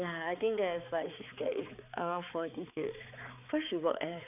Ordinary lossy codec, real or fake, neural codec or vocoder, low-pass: none; fake; codec, 16 kHz, 4 kbps, X-Codec, HuBERT features, trained on balanced general audio; 3.6 kHz